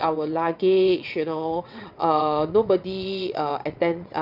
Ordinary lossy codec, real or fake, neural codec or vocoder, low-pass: none; fake; vocoder, 22.05 kHz, 80 mel bands, WaveNeXt; 5.4 kHz